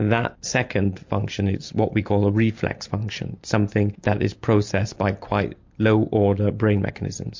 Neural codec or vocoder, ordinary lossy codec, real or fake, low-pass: none; MP3, 48 kbps; real; 7.2 kHz